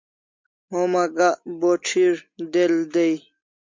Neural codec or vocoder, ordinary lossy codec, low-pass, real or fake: none; MP3, 64 kbps; 7.2 kHz; real